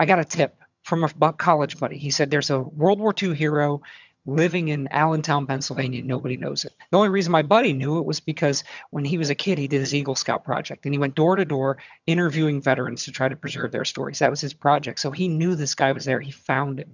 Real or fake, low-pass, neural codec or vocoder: fake; 7.2 kHz; vocoder, 22.05 kHz, 80 mel bands, HiFi-GAN